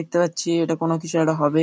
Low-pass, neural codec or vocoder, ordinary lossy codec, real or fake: none; none; none; real